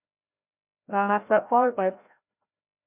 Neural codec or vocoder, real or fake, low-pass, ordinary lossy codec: codec, 16 kHz, 0.5 kbps, FreqCodec, larger model; fake; 3.6 kHz; MP3, 32 kbps